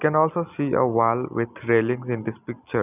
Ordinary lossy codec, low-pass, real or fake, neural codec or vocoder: none; 3.6 kHz; real; none